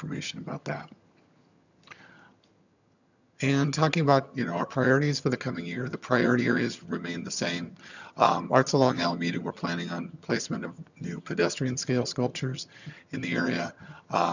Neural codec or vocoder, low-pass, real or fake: vocoder, 22.05 kHz, 80 mel bands, HiFi-GAN; 7.2 kHz; fake